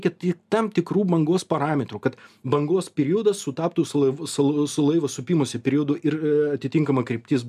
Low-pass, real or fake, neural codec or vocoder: 14.4 kHz; real; none